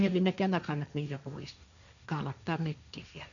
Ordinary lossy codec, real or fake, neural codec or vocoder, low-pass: none; fake; codec, 16 kHz, 1.1 kbps, Voila-Tokenizer; 7.2 kHz